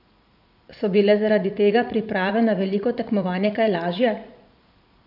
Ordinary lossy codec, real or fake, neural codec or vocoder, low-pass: none; fake; vocoder, 44.1 kHz, 80 mel bands, Vocos; 5.4 kHz